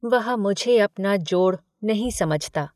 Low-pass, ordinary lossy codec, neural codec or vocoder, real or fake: 14.4 kHz; none; none; real